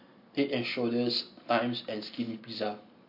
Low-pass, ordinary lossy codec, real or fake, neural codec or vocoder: 5.4 kHz; MP3, 32 kbps; real; none